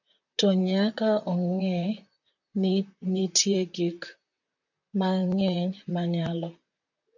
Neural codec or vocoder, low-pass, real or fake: vocoder, 44.1 kHz, 128 mel bands, Pupu-Vocoder; 7.2 kHz; fake